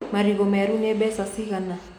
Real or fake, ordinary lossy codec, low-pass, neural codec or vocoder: real; none; 19.8 kHz; none